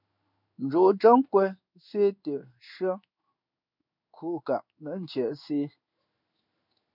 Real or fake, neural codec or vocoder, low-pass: fake; codec, 16 kHz in and 24 kHz out, 1 kbps, XY-Tokenizer; 5.4 kHz